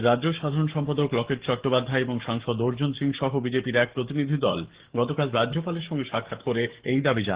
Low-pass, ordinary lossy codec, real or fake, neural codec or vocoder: 3.6 kHz; Opus, 16 kbps; fake; codec, 44.1 kHz, 7.8 kbps, DAC